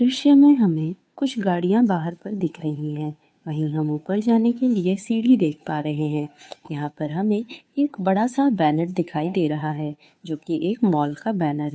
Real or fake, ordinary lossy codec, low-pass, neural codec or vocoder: fake; none; none; codec, 16 kHz, 2 kbps, FunCodec, trained on Chinese and English, 25 frames a second